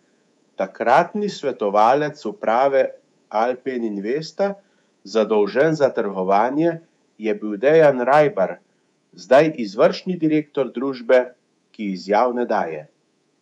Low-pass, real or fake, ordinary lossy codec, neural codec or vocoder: 10.8 kHz; fake; none; codec, 24 kHz, 3.1 kbps, DualCodec